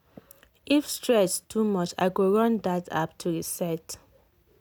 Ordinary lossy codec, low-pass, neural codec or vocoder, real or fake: none; none; none; real